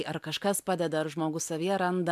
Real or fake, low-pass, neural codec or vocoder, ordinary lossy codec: real; 14.4 kHz; none; MP3, 96 kbps